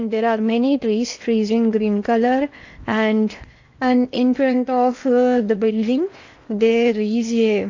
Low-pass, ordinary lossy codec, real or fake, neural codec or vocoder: 7.2 kHz; AAC, 48 kbps; fake; codec, 16 kHz in and 24 kHz out, 0.8 kbps, FocalCodec, streaming, 65536 codes